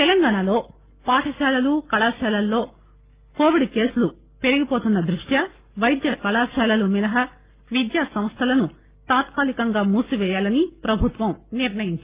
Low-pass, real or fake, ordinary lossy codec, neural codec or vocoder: 3.6 kHz; real; Opus, 32 kbps; none